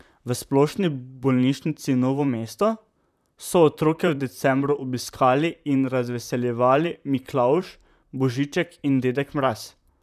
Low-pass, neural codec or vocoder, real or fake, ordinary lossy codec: 14.4 kHz; vocoder, 44.1 kHz, 128 mel bands, Pupu-Vocoder; fake; none